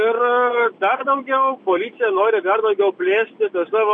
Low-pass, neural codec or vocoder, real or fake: 9.9 kHz; none; real